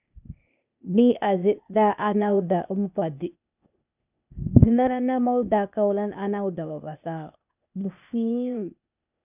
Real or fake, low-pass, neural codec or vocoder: fake; 3.6 kHz; codec, 16 kHz, 0.8 kbps, ZipCodec